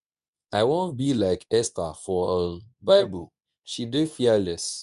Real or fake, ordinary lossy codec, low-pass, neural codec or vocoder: fake; none; 10.8 kHz; codec, 24 kHz, 0.9 kbps, WavTokenizer, medium speech release version 2